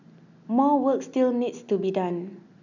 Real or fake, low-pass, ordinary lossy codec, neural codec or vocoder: real; 7.2 kHz; none; none